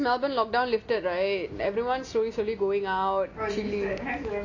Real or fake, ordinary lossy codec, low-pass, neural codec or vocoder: real; none; 7.2 kHz; none